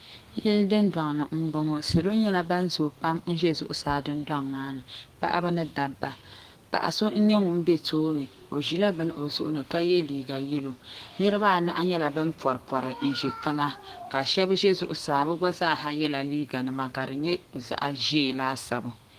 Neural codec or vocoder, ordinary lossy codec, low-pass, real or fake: codec, 32 kHz, 1.9 kbps, SNAC; Opus, 24 kbps; 14.4 kHz; fake